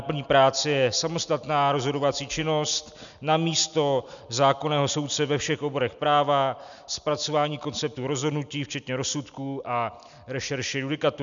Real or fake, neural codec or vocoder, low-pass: real; none; 7.2 kHz